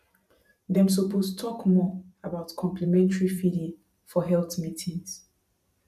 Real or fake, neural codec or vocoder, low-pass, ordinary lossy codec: fake; vocoder, 44.1 kHz, 128 mel bands every 512 samples, BigVGAN v2; 14.4 kHz; none